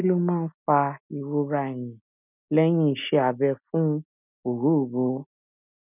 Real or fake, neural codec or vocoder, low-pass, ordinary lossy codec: real; none; 3.6 kHz; none